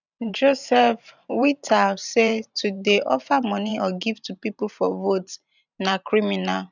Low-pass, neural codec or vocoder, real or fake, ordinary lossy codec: 7.2 kHz; vocoder, 44.1 kHz, 128 mel bands every 512 samples, BigVGAN v2; fake; none